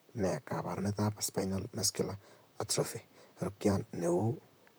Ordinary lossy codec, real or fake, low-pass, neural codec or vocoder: none; fake; none; vocoder, 44.1 kHz, 128 mel bands, Pupu-Vocoder